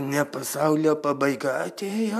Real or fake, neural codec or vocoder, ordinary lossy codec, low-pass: fake; vocoder, 44.1 kHz, 128 mel bands every 512 samples, BigVGAN v2; AAC, 96 kbps; 14.4 kHz